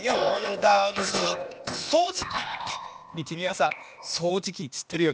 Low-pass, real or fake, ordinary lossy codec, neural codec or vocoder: none; fake; none; codec, 16 kHz, 0.8 kbps, ZipCodec